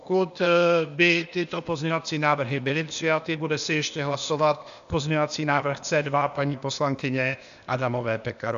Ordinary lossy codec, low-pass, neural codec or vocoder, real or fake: AAC, 96 kbps; 7.2 kHz; codec, 16 kHz, 0.8 kbps, ZipCodec; fake